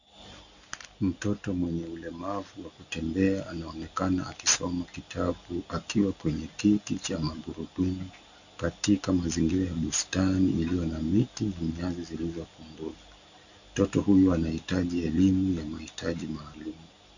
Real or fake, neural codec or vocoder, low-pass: real; none; 7.2 kHz